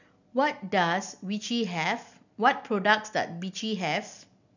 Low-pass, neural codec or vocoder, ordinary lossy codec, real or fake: 7.2 kHz; none; none; real